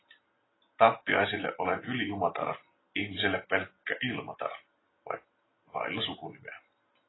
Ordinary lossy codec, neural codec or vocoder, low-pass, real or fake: AAC, 16 kbps; none; 7.2 kHz; real